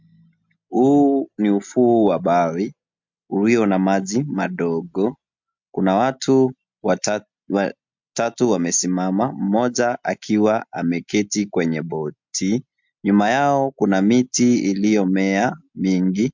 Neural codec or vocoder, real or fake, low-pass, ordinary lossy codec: none; real; 7.2 kHz; MP3, 64 kbps